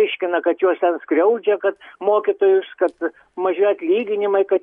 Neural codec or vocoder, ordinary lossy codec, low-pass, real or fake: none; MP3, 64 kbps; 10.8 kHz; real